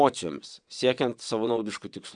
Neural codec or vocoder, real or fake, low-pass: vocoder, 22.05 kHz, 80 mel bands, WaveNeXt; fake; 9.9 kHz